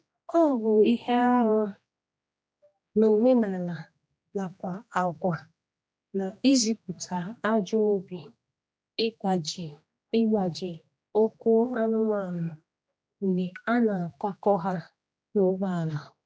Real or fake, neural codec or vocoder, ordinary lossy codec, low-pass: fake; codec, 16 kHz, 1 kbps, X-Codec, HuBERT features, trained on general audio; none; none